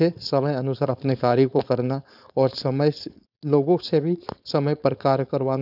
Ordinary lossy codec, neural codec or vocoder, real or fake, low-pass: none; codec, 16 kHz, 4.8 kbps, FACodec; fake; 5.4 kHz